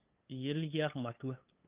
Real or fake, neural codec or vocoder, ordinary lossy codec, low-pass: fake; codec, 16 kHz, 8 kbps, FunCodec, trained on LibriTTS, 25 frames a second; Opus, 32 kbps; 3.6 kHz